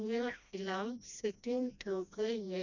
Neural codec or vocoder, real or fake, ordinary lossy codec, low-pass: codec, 16 kHz, 1 kbps, FreqCodec, smaller model; fake; none; 7.2 kHz